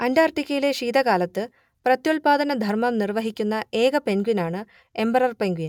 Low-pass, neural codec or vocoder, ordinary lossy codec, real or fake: 19.8 kHz; none; none; real